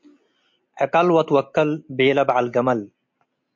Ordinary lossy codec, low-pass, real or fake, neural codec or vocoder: MP3, 48 kbps; 7.2 kHz; real; none